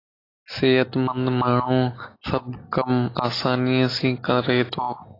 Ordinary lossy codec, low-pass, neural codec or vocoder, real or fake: AAC, 24 kbps; 5.4 kHz; none; real